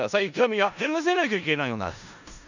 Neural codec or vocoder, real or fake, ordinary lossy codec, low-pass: codec, 16 kHz in and 24 kHz out, 0.4 kbps, LongCat-Audio-Codec, four codebook decoder; fake; none; 7.2 kHz